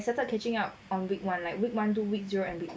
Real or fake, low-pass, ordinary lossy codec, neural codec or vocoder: real; none; none; none